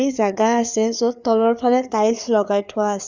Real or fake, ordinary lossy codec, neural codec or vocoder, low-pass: fake; Opus, 64 kbps; codec, 16 kHz, 4 kbps, FreqCodec, larger model; 7.2 kHz